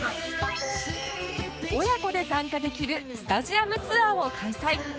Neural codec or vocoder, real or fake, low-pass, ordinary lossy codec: codec, 16 kHz, 4 kbps, X-Codec, HuBERT features, trained on general audio; fake; none; none